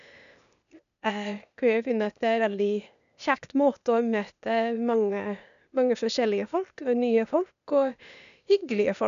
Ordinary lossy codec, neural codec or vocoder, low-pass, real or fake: none; codec, 16 kHz, 0.8 kbps, ZipCodec; 7.2 kHz; fake